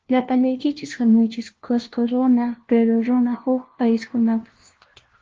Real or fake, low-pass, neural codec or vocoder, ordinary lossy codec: fake; 7.2 kHz; codec, 16 kHz, 1 kbps, FunCodec, trained on LibriTTS, 50 frames a second; Opus, 16 kbps